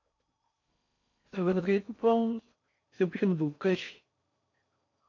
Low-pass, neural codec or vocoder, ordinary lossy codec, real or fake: 7.2 kHz; codec, 16 kHz in and 24 kHz out, 0.6 kbps, FocalCodec, streaming, 4096 codes; AAC, 48 kbps; fake